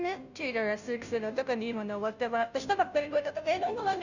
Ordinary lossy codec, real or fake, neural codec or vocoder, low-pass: none; fake; codec, 16 kHz, 0.5 kbps, FunCodec, trained on Chinese and English, 25 frames a second; 7.2 kHz